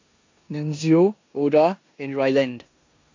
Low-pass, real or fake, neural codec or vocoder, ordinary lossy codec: 7.2 kHz; fake; codec, 16 kHz in and 24 kHz out, 0.9 kbps, LongCat-Audio-Codec, four codebook decoder; AAC, 48 kbps